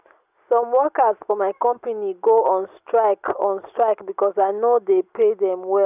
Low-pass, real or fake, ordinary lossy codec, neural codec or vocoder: 3.6 kHz; real; none; none